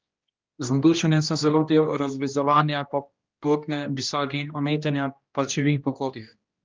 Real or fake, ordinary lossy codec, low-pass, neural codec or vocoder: fake; Opus, 24 kbps; 7.2 kHz; codec, 16 kHz, 1 kbps, X-Codec, HuBERT features, trained on general audio